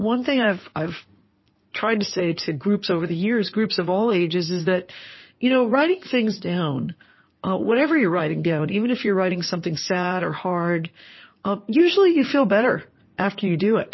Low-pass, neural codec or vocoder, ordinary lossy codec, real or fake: 7.2 kHz; codec, 16 kHz in and 24 kHz out, 2.2 kbps, FireRedTTS-2 codec; MP3, 24 kbps; fake